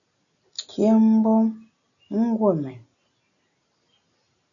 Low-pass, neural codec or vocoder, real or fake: 7.2 kHz; none; real